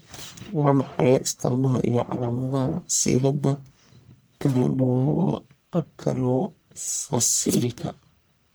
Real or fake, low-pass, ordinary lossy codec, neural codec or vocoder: fake; none; none; codec, 44.1 kHz, 1.7 kbps, Pupu-Codec